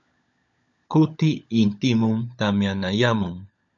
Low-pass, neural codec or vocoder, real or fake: 7.2 kHz; codec, 16 kHz, 16 kbps, FunCodec, trained on LibriTTS, 50 frames a second; fake